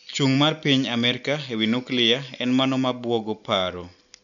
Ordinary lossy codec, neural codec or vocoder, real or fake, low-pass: none; none; real; 7.2 kHz